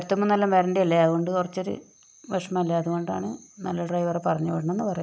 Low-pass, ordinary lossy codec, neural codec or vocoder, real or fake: none; none; none; real